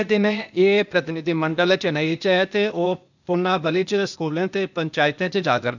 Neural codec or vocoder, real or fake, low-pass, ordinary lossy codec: codec, 16 kHz, 0.8 kbps, ZipCodec; fake; 7.2 kHz; none